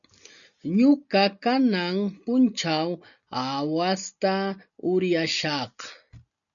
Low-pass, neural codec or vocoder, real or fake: 7.2 kHz; none; real